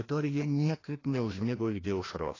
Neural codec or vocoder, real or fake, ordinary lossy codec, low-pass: codec, 16 kHz, 1 kbps, FreqCodec, larger model; fake; AAC, 32 kbps; 7.2 kHz